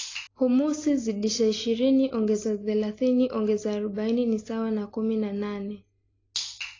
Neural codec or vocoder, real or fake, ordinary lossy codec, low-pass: none; real; AAC, 32 kbps; 7.2 kHz